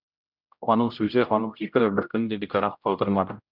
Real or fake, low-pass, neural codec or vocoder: fake; 5.4 kHz; codec, 16 kHz, 0.5 kbps, X-Codec, HuBERT features, trained on general audio